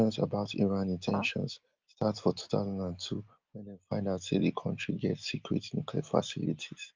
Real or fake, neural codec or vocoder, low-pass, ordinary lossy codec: real; none; 7.2 kHz; Opus, 32 kbps